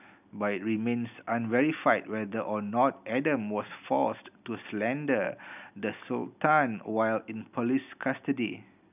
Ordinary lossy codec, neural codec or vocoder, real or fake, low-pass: none; none; real; 3.6 kHz